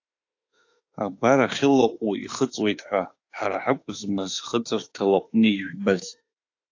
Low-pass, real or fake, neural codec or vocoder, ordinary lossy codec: 7.2 kHz; fake; autoencoder, 48 kHz, 32 numbers a frame, DAC-VAE, trained on Japanese speech; AAC, 48 kbps